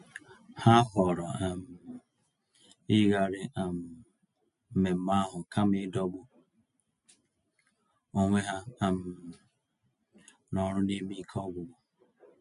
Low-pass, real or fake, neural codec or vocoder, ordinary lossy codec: 10.8 kHz; real; none; MP3, 64 kbps